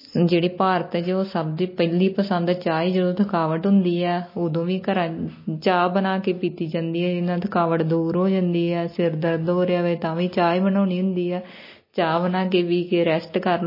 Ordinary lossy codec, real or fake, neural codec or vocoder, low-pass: MP3, 24 kbps; real; none; 5.4 kHz